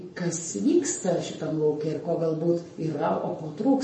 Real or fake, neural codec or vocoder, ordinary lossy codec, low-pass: fake; codec, 44.1 kHz, 7.8 kbps, Pupu-Codec; MP3, 32 kbps; 10.8 kHz